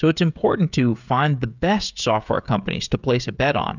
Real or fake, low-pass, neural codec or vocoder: fake; 7.2 kHz; codec, 16 kHz, 16 kbps, FreqCodec, smaller model